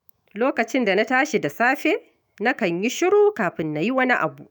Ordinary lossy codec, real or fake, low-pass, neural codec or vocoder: none; fake; none; autoencoder, 48 kHz, 128 numbers a frame, DAC-VAE, trained on Japanese speech